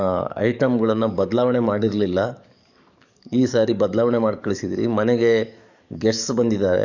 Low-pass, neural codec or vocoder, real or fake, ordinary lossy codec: 7.2 kHz; codec, 44.1 kHz, 7.8 kbps, DAC; fake; none